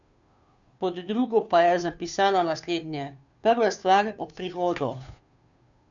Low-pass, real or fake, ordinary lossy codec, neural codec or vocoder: 7.2 kHz; fake; none; codec, 16 kHz, 2 kbps, FunCodec, trained on Chinese and English, 25 frames a second